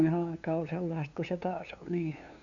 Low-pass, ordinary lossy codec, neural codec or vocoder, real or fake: 7.2 kHz; Opus, 64 kbps; codec, 16 kHz, 2 kbps, FunCodec, trained on LibriTTS, 25 frames a second; fake